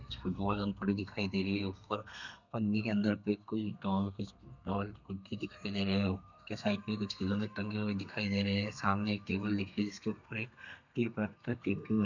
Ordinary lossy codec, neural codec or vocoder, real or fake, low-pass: none; codec, 32 kHz, 1.9 kbps, SNAC; fake; 7.2 kHz